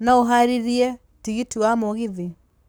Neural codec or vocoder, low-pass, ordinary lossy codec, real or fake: codec, 44.1 kHz, 7.8 kbps, Pupu-Codec; none; none; fake